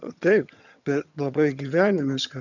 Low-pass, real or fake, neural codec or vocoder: 7.2 kHz; fake; vocoder, 22.05 kHz, 80 mel bands, HiFi-GAN